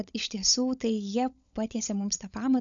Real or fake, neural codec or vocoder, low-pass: fake; codec, 16 kHz, 16 kbps, FunCodec, trained on LibriTTS, 50 frames a second; 7.2 kHz